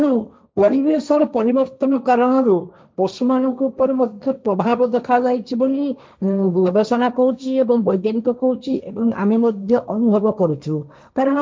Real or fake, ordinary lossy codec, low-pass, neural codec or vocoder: fake; none; none; codec, 16 kHz, 1.1 kbps, Voila-Tokenizer